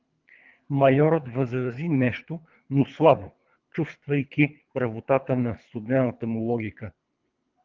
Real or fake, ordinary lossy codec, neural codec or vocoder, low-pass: fake; Opus, 32 kbps; codec, 24 kHz, 3 kbps, HILCodec; 7.2 kHz